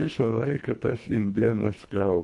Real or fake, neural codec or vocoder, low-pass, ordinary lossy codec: fake; codec, 24 kHz, 1.5 kbps, HILCodec; 10.8 kHz; AAC, 64 kbps